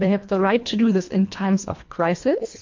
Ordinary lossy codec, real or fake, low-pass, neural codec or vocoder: MP3, 48 kbps; fake; 7.2 kHz; codec, 24 kHz, 1.5 kbps, HILCodec